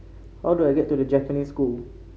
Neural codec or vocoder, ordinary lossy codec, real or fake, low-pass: none; none; real; none